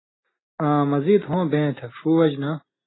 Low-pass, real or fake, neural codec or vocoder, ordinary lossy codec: 7.2 kHz; real; none; AAC, 16 kbps